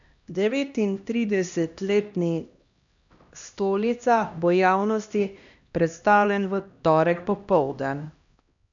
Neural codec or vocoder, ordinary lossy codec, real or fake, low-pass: codec, 16 kHz, 1 kbps, X-Codec, HuBERT features, trained on LibriSpeech; none; fake; 7.2 kHz